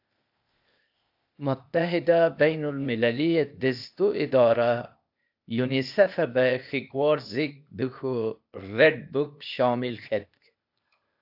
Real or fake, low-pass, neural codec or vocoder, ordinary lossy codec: fake; 5.4 kHz; codec, 16 kHz, 0.8 kbps, ZipCodec; AAC, 48 kbps